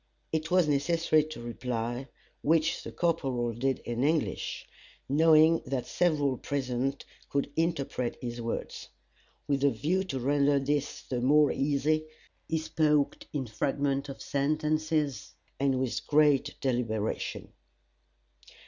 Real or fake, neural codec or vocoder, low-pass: real; none; 7.2 kHz